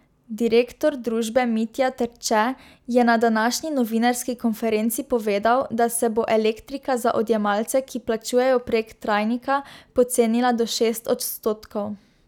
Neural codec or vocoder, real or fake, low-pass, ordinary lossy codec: none; real; 19.8 kHz; none